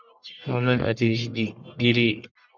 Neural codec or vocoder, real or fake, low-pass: codec, 44.1 kHz, 1.7 kbps, Pupu-Codec; fake; 7.2 kHz